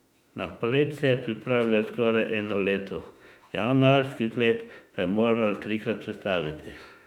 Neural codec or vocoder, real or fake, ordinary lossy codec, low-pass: autoencoder, 48 kHz, 32 numbers a frame, DAC-VAE, trained on Japanese speech; fake; none; 19.8 kHz